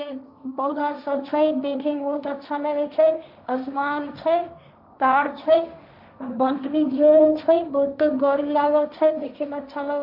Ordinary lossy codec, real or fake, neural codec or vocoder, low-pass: none; fake; codec, 16 kHz, 1.1 kbps, Voila-Tokenizer; 5.4 kHz